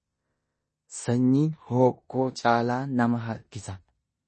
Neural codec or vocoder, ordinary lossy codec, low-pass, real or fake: codec, 16 kHz in and 24 kHz out, 0.9 kbps, LongCat-Audio-Codec, four codebook decoder; MP3, 32 kbps; 10.8 kHz; fake